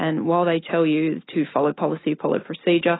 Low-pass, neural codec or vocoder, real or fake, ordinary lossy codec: 7.2 kHz; none; real; AAC, 16 kbps